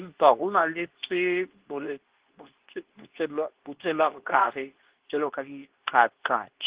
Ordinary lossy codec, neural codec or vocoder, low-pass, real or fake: Opus, 16 kbps; codec, 24 kHz, 0.9 kbps, WavTokenizer, medium speech release version 2; 3.6 kHz; fake